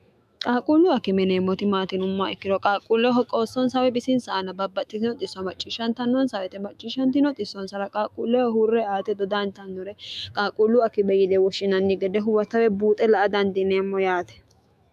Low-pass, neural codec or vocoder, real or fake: 14.4 kHz; autoencoder, 48 kHz, 128 numbers a frame, DAC-VAE, trained on Japanese speech; fake